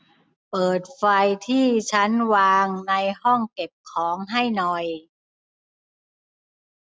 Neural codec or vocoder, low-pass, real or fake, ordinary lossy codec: none; none; real; none